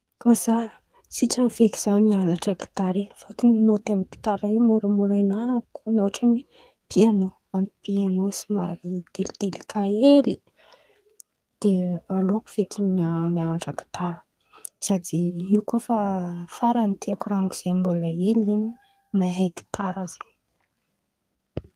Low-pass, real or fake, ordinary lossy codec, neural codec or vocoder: 14.4 kHz; fake; Opus, 32 kbps; codec, 32 kHz, 1.9 kbps, SNAC